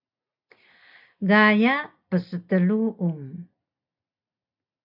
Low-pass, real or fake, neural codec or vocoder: 5.4 kHz; real; none